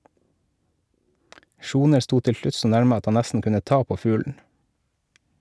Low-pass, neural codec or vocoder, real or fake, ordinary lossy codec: none; none; real; none